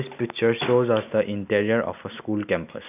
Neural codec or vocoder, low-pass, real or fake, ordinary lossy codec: none; 3.6 kHz; real; none